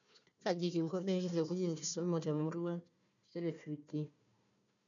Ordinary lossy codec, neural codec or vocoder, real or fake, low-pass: none; codec, 16 kHz, 1 kbps, FunCodec, trained on Chinese and English, 50 frames a second; fake; 7.2 kHz